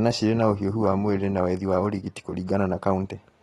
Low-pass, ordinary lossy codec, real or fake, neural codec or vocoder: 19.8 kHz; AAC, 32 kbps; real; none